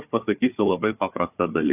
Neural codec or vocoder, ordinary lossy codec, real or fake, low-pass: codec, 16 kHz, 4 kbps, FunCodec, trained on Chinese and English, 50 frames a second; AAC, 32 kbps; fake; 3.6 kHz